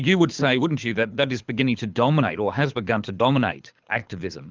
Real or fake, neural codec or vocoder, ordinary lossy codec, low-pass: fake; codec, 24 kHz, 6 kbps, HILCodec; Opus, 24 kbps; 7.2 kHz